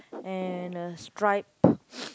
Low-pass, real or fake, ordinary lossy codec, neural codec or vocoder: none; real; none; none